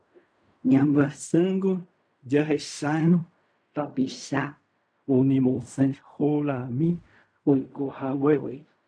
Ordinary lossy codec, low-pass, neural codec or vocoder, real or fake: MP3, 64 kbps; 9.9 kHz; codec, 16 kHz in and 24 kHz out, 0.4 kbps, LongCat-Audio-Codec, fine tuned four codebook decoder; fake